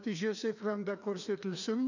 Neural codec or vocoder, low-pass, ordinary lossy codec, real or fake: codec, 16 kHz, 2 kbps, FreqCodec, larger model; 7.2 kHz; none; fake